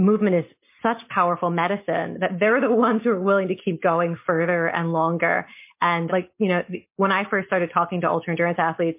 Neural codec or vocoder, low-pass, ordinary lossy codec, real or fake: none; 3.6 kHz; MP3, 24 kbps; real